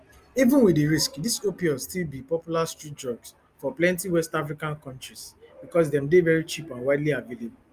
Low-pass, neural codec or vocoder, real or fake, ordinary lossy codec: 14.4 kHz; none; real; Opus, 32 kbps